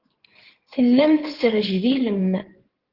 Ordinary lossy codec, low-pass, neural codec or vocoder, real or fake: Opus, 16 kbps; 5.4 kHz; codec, 16 kHz in and 24 kHz out, 2.2 kbps, FireRedTTS-2 codec; fake